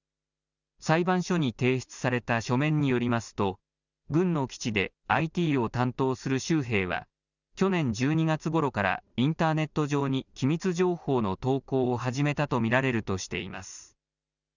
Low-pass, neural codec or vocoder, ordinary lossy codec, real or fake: 7.2 kHz; none; none; real